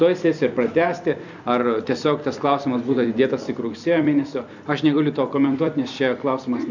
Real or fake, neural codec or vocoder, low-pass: fake; vocoder, 44.1 kHz, 128 mel bands every 256 samples, BigVGAN v2; 7.2 kHz